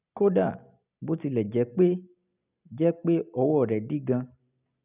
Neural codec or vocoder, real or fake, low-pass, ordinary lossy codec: none; real; 3.6 kHz; none